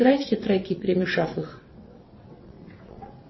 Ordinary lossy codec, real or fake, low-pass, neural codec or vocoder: MP3, 24 kbps; fake; 7.2 kHz; vocoder, 22.05 kHz, 80 mel bands, WaveNeXt